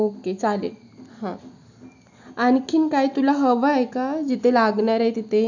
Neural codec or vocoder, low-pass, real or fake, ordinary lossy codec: none; 7.2 kHz; real; none